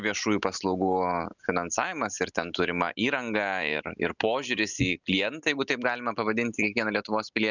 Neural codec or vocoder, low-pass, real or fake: none; 7.2 kHz; real